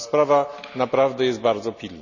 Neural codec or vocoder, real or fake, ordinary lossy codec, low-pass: none; real; none; 7.2 kHz